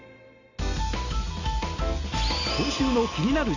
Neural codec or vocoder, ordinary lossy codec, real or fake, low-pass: none; none; real; 7.2 kHz